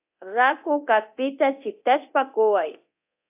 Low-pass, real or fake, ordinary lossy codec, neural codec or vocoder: 3.6 kHz; fake; AAC, 32 kbps; codec, 24 kHz, 0.9 kbps, DualCodec